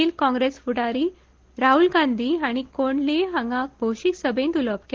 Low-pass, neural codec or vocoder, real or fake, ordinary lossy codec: 7.2 kHz; none; real; Opus, 16 kbps